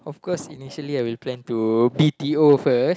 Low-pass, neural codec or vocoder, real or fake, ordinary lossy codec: none; none; real; none